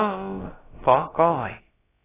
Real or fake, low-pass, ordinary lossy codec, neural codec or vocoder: fake; 3.6 kHz; AAC, 16 kbps; codec, 16 kHz, about 1 kbps, DyCAST, with the encoder's durations